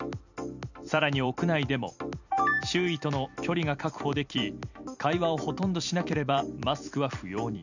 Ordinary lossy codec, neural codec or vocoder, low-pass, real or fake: none; vocoder, 44.1 kHz, 128 mel bands every 256 samples, BigVGAN v2; 7.2 kHz; fake